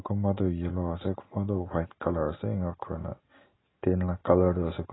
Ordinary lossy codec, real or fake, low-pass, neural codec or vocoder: AAC, 16 kbps; real; 7.2 kHz; none